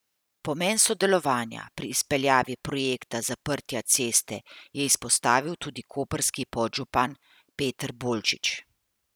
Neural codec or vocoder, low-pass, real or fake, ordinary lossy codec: none; none; real; none